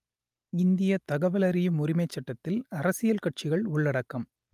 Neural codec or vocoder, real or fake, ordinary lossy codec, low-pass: none; real; Opus, 32 kbps; 14.4 kHz